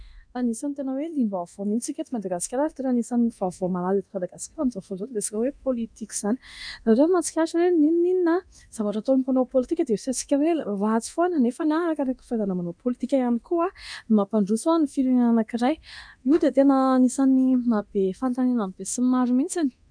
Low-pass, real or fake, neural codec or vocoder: 9.9 kHz; fake; codec, 24 kHz, 0.9 kbps, DualCodec